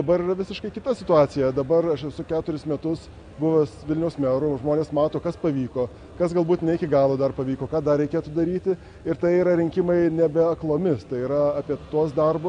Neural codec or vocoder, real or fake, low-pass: none; real; 9.9 kHz